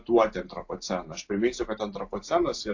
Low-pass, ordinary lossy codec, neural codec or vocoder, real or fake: 7.2 kHz; AAC, 48 kbps; none; real